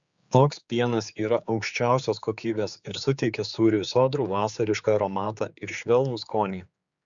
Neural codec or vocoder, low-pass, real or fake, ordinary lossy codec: codec, 16 kHz, 4 kbps, X-Codec, HuBERT features, trained on general audio; 7.2 kHz; fake; Opus, 64 kbps